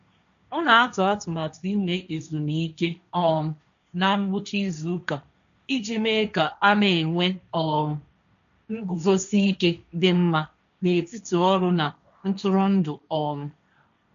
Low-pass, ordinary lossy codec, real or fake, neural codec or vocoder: 7.2 kHz; AAC, 96 kbps; fake; codec, 16 kHz, 1.1 kbps, Voila-Tokenizer